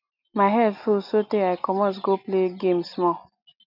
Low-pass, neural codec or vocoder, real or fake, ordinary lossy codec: 5.4 kHz; none; real; none